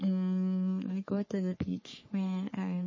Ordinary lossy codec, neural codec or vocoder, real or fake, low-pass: MP3, 32 kbps; codec, 44.1 kHz, 3.4 kbps, Pupu-Codec; fake; 7.2 kHz